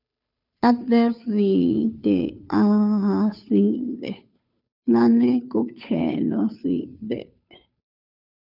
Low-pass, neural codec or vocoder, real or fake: 5.4 kHz; codec, 16 kHz, 2 kbps, FunCodec, trained on Chinese and English, 25 frames a second; fake